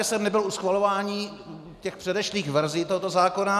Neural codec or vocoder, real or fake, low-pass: none; real; 14.4 kHz